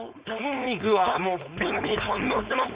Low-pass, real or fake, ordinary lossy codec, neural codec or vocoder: 3.6 kHz; fake; Opus, 64 kbps; codec, 16 kHz, 4.8 kbps, FACodec